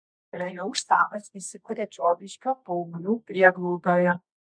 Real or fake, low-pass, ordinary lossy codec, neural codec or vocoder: fake; 9.9 kHz; MP3, 64 kbps; codec, 24 kHz, 0.9 kbps, WavTokenizer, medium music audio release